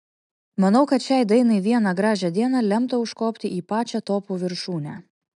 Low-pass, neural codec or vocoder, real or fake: 10.8 kHz; none; real